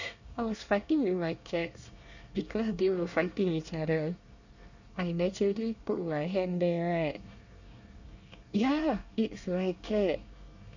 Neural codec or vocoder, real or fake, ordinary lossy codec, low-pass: codec, 24 kHz, 1 kbps, SNAC; fake; none; 7.2 kHz